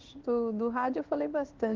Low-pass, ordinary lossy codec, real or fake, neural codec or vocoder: 7.2 kHz; Opus, 24 kbps; fake; codec, 16 kHz in and 24 kHz out, 1 kbps, XY-Tokenizer